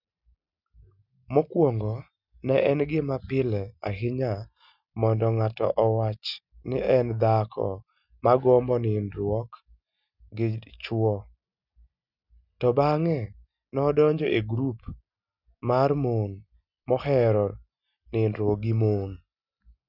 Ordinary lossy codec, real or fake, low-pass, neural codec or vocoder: none; real; 5.4 kHz; none